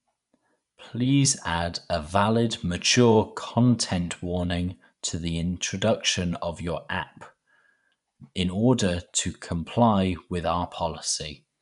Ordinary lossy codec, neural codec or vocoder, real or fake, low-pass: none; vocoder, 24 kHz, 100 mel bands, Vocos; fake; 10.8 kHz